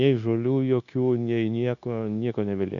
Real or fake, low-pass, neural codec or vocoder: fake; 7.2 kHz; codec, 16 kHz, 0.9 kbps, LongCat-Audio-Codec